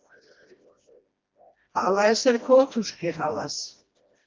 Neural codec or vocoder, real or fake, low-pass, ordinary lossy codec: codec, 16 kHz, 1 kbps, FreqCodec, smaller model; fake; 7.2 kHz; Opus, 32 kbps